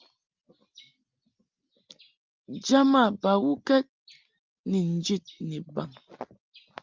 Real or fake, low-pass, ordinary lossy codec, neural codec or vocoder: real; 7.2 kHz; Opus, 24 kbps; none